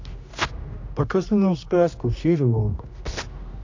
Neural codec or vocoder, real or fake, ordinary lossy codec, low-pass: codec, 16 kHz, 1 kbps, X-Codec, HuBERT features, trained on general audio; fake; AAC, 48 kbps; 7.2 kHz